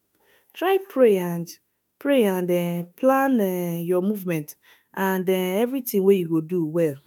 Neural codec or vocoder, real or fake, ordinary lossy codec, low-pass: autoencoder, 48 kHz, 32 numbers a frame, DAC-VAE, trained on Japanese speech; fake; none; none